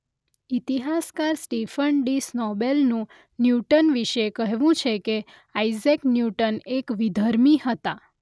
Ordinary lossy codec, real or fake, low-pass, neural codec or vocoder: none; real; none; none